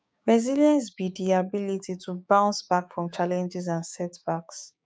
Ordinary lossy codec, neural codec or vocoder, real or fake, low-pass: none; codec, 16 kHz, 6 kbps, DAC; fake; none